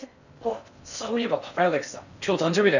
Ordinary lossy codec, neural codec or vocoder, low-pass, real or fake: none; codec, 16 kHz in and 24 kHz out, 0.6 kbps, FocalCodec, streaming, 4096 codes; 7.2 kHz; fake